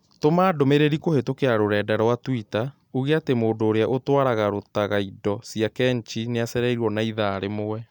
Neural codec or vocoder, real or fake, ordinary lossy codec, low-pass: none; real; none; 19.8 kHz